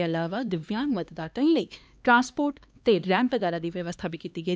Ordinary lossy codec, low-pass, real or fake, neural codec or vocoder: none; none; fake; codec, 16 kHz, 2 kbps, X-Codec, HuBERT features, trained on LibriSpeech